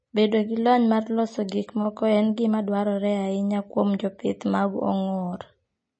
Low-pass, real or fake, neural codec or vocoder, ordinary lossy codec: 9.9 kHz; real; none; MP3, 32 kbps